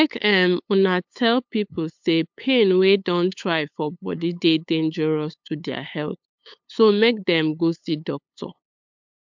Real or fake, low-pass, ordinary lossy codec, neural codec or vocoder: fake; 7.2 kHz; MP3, 64 kbps; codec, 16 kHz, 8 kbps, FunCodec, trained on LibriTTS, 25 frames a second